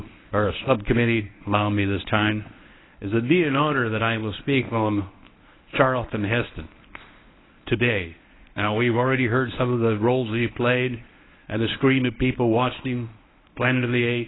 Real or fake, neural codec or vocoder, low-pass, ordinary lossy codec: fake; codec, 24 kHz, 0.9 kbps, WavTokenizer, medium speech release version 1; 7.2 kHz; AAC, 16 kbps